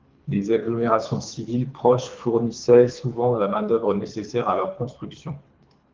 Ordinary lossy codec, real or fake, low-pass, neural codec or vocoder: Opus, 32 kbps; fake; 7.2 kHz; codec, 24 kHz, 3 kbps, HILCodec